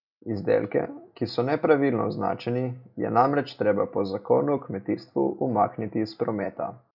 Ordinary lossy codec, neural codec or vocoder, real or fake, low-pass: none; none; real; 5.4 kHz